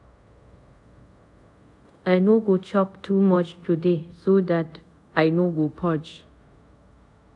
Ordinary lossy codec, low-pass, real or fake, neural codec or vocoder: AAC, 64 kbps; 10.8 kHz; fake; codec, 24 kHz, 0.5 kbps, DualCodec